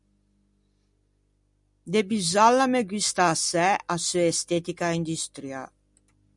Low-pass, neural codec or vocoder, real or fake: 10.8 kHz; none; real